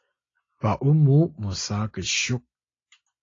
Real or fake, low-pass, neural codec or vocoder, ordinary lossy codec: real; 7.2 kHz; none; AAC, 32 kbps